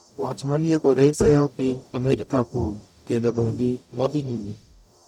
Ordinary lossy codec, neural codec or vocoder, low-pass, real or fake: none; codec, 44.1 kHz, 0.9 kbps, DAC; 19.8 kHz; fake